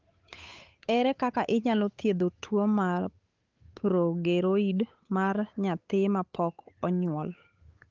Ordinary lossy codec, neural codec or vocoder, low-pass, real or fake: Opus, 32 kbps; codec, 16 kHz, 8 kbps, FunCodec, trained on Chinese and English, 25 frames a second; 7.2 kHz; fake